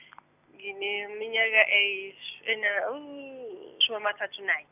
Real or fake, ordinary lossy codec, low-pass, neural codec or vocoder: real; none; 3.6 kHz; none